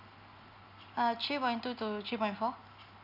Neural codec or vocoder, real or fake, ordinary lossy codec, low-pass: none; real; none; 5.4 kHz